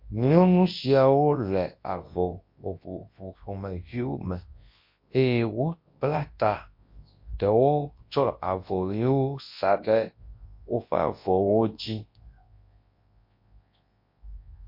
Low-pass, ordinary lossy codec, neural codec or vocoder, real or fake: 5.4 kHz; MP3, 32 kbps; codec, 24 kHz, 0.9 kbps, WavTokenizer, large speech release; fake